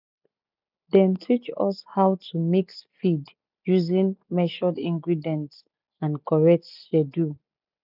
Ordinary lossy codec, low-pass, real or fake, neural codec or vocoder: none; 5.4 kHz; real; none